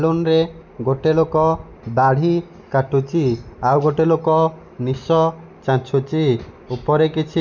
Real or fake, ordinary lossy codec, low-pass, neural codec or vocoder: real; none; 7.2 kHz; none